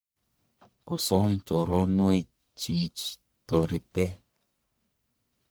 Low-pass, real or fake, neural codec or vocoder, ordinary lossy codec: none; fake; codec, 44.1 kHz, 1.7 kbps, Pupu-Codec; none